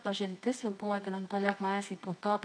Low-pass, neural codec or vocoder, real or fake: 9.9 kHz; codec, 24 kHz, 0.9 kbps, WavTokenizer, medium music audio release; fake